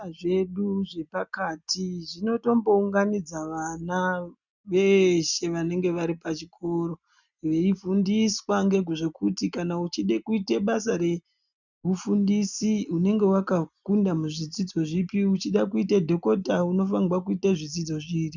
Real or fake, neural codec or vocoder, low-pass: real; none; 7.2 kHz